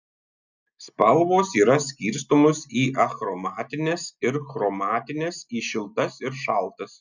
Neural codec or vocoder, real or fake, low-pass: none; real; 7.2 kHz